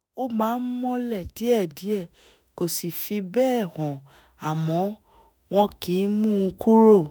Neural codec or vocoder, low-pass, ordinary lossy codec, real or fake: autoencoder, 48 kHz, 32 numbers a frame, DAC-VAE, trained on Japanese speech; none; none; fake